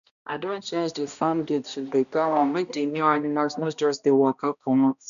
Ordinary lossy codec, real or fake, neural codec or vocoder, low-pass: none; fake; codec, 16 kHz, 1 kbps, X-Codec, HuBERT features, trained on balanced general audio; 7.2 kHz